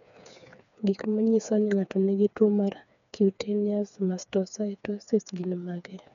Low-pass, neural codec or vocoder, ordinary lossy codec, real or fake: 7.2 kHz; codec, 16 kHz, 4 kbps, FreqCodec, smaller model; none; fake